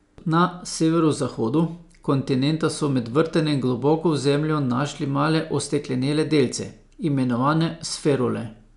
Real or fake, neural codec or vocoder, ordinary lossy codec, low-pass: real; none; none; 10.8 kHz